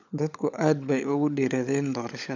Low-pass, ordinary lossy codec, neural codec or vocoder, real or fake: 7.2 kHz; none; codec, 16 kHz, 16 kbps, FunCodec, trained on LibriTTS, 50 frames a second; fake